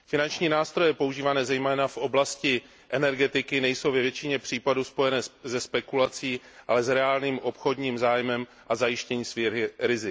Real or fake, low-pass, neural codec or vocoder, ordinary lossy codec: real; none; none; none